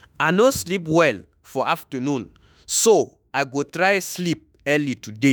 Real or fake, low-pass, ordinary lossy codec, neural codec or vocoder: fake; none; none; autoencoder, 48 kHz, 32 numbers a frame, DAC-VAE, trained on Japanese speech